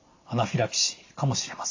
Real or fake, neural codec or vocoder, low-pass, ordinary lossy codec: real; none; 7.2 kHz; AAC, 48 kbps